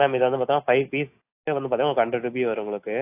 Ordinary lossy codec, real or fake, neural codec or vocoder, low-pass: AAC, 24 kbps; real; none; 3.6 kHz